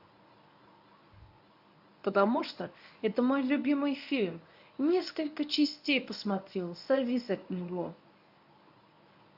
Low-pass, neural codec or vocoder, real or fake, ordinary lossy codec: 5.4 kHz; codec, 24 kHz, 0.9 kbps, WavTokenizer, medium speech release version 1; fake; AAC, 48 kbps